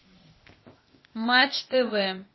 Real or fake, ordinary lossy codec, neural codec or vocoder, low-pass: fake; MP3, 24 kbps; codec, 16 kHz, 0.8 kbps, ZipCodec; 7.2 kHz